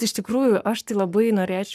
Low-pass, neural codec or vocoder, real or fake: 14.4 kHz; codec, 44.1 kHz, 7.8 kbps, Pupu-Codec; fake